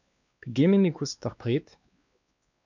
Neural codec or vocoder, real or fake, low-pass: codec, 16 kHz, 2 kbps, X-Codec, WavLM features, trained on Multilingual LibriSpeech; fake; 7.2 kHz